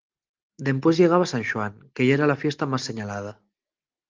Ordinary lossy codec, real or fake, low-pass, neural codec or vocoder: Opus, 32 kbps; real; 7.2 kHz; none